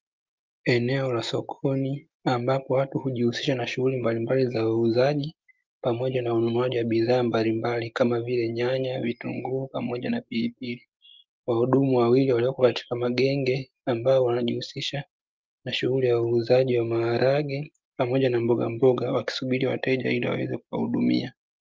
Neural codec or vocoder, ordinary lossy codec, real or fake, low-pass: none; Opus, 24 kbps; real; 7.2 kHz